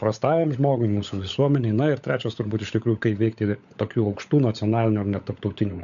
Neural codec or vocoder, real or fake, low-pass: codec, 16 kHz, 16 kbps, FunCodec, trained on LibriTTS, 50 frames a second; fake; 7.2 kHz